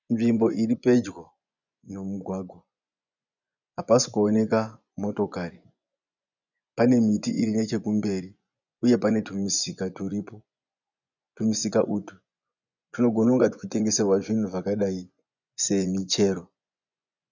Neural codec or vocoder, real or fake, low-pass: vocoder, 24 kHz, 100 mel bands, Vocos; fake; 7.2 kHz